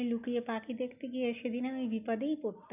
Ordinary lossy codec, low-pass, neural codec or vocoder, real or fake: none; 3.6 kHz; none; real